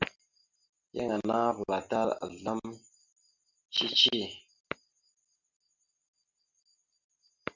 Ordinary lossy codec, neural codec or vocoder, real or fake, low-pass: Opus, 64 kbps; none; real; 7.2 kHz